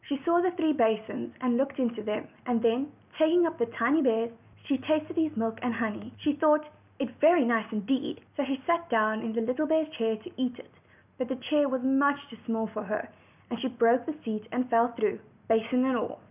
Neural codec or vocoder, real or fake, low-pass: none; real; 3.6 kHz